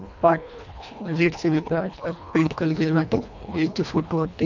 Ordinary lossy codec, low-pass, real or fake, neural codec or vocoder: none; 7.2 kHz; fake; codec, 24 kHz, 1.5 kbps, HILCodec